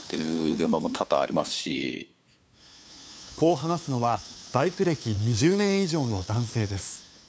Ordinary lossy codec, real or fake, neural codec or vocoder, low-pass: none; fake; codec, 16 kHz, 2 kbps, FunCodec, trained on LibriTTS, 25 frames a second; none